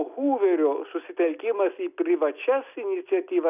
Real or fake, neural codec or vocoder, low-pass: real; none; 3.6 kHz